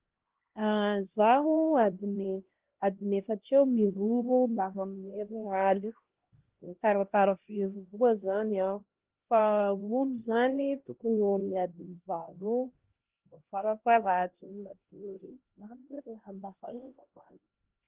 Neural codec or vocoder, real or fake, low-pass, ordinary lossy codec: codec, 16 kHz, 1 kbps, X-Codec, HuBERT features, trained on LibriSpeech; fake; 3.6 kHz; Opus, 16 kbps